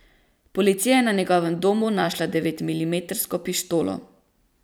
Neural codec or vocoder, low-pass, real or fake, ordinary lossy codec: vocoder, 44.1 kHz, 128 mel bands every 256 samples, BigVGAN v2; none; fake; none